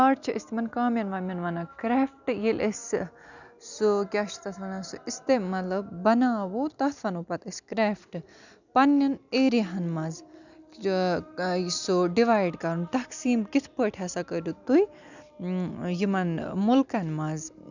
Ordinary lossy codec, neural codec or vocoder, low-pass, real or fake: none; none; 7.2 kHz; real